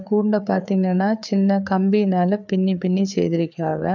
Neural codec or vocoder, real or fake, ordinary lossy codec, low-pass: codec, 16 kHz, 4 kbps, FreqCodec, larger model; fake; none; 7.2 kHz